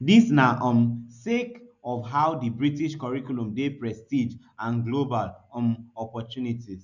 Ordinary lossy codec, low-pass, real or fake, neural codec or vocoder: none; 7.2 kHz; real; none